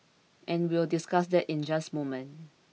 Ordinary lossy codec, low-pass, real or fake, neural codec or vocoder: none; none; real; none